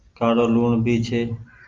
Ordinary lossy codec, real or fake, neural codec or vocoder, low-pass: Opus, 32 kbps; real; none; 7.2 kHz